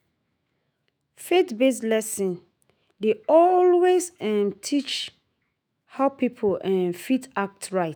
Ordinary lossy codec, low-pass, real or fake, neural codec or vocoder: none; none; fake; autoencoder, 48 kHz, 128 numbers a frame, DAC-VAE, trained on Japanese speech